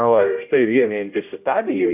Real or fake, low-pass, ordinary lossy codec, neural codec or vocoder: fake; 3.6 kHz; AAC, 32 kbps; codec, 16 kHz, 0.5 kbps, X-Codec, HuBERT features, trained on general audio